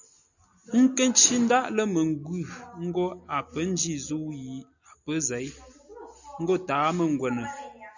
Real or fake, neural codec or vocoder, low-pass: real; none; 7.2 kHz